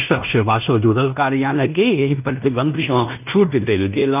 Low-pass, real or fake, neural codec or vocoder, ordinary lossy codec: 3.6 kHz; fake; codec, 16 kHz in and 24 kHz out, 0.9 kbps, LongCat-Audio-Codec, fine tuned four codebook decoder; none